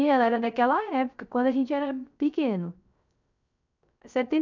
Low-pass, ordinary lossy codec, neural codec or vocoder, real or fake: 7.2 kHz; none; codec, 16 kHz, 0.3 kbps, FocalCodec; fake